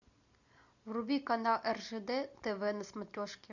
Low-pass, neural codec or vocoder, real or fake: 7.2 kHz; none; real